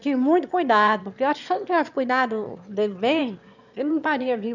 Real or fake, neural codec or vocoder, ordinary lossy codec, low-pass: fake; autoencoder, 22.05 kHz, a latent of 192 numbers a frame, VITS, trained on one speaker; none; 7.2 kHz